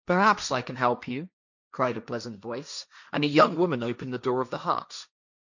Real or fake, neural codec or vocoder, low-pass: fake; codec, 16 kHz, 1.1 kbps, Voila-Tokenizer; 7.2 kHz